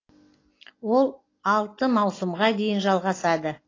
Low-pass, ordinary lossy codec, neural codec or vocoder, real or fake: 7.2 kHz; AAC, 32 kbps; none; real